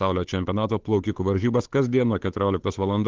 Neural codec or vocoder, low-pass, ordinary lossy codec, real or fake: codec, 16 kHz, 8 kbps, FunCodec, trained on Chinese and English, 25 frames a second; 7.2 kHz; Opus, 16 kbps; fake